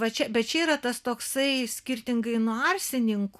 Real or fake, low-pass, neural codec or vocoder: real; 14.4 kHz; none